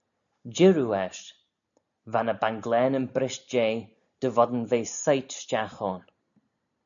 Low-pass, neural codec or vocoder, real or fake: 7.2 kHz; none; real